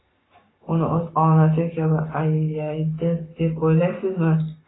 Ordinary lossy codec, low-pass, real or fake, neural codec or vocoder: AAC, 16 kbps; 7.2 kHz; fake; codec, 44.1 kHz, 7.8 kbps, Pupu-Codec